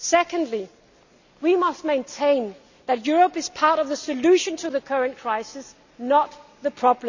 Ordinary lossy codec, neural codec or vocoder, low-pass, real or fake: none; none; 7.2 kHz; real